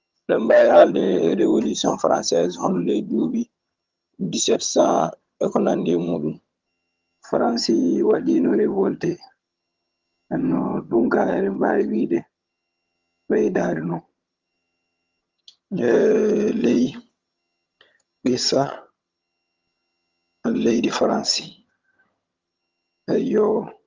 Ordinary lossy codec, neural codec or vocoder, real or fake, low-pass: Opus, 32 kbps; vocoder, 22.05 kHz, 80 mel bands, HiFi-GAN; fake; 7.2 kHz